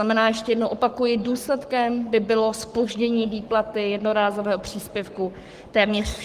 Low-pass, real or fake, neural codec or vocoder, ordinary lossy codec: 14.4 kHz; fake; codec, 44.1 kHz, 7.8 kbps, Pupu-Codec; Opus, 24 kbps